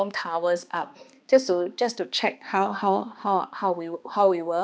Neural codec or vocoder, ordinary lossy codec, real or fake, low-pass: codec, 16 kHz, 2 kbps, X-Codec, HuBERT features, trained on balanced general audio; none; fake; none